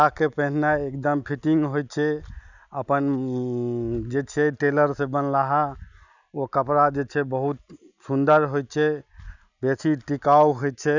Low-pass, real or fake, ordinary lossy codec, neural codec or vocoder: 7.2 kHz; real; none; none